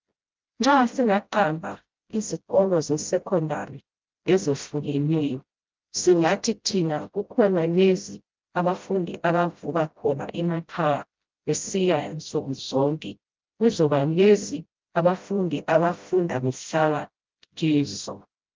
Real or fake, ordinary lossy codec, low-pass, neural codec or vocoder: fake; Opus, 24 kbps; 7.2 kHz; codec, 16 kHz, 0.5 kbps, FreqCodec, smaller model